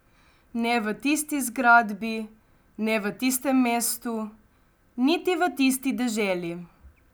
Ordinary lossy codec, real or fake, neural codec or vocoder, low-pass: none; real; none; none